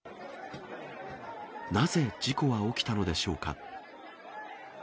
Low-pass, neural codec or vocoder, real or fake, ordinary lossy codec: none; none; real; none